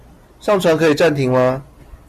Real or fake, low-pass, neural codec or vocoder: real; 14.4 kHz; none